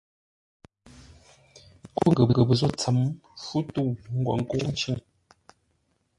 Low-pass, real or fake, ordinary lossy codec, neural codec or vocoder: 10.8 kHz; real; MP3, 48 kbps; none